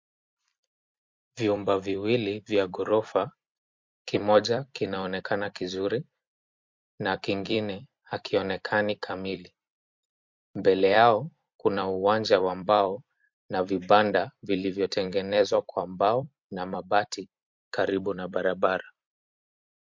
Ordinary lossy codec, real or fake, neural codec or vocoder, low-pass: MP3, 48 kbps; fake; vocoder, 44.1 kHz, 128 mel bands every 256 samples, BigVGAN v2; 7.2 kHz